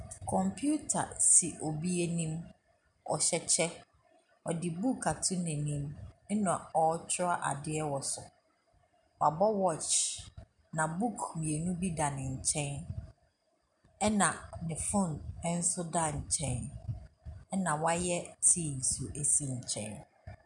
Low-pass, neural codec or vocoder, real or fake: 10.8 kHz; none; real